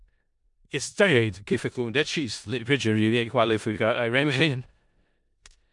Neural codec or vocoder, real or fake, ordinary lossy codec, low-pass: codec, 16 kHz in and 24 kHz out, 0.4 kbps, LongCat-Audio-Codec, four codebook decoder; fake; MP3, 64 kbps; 10.8 kHz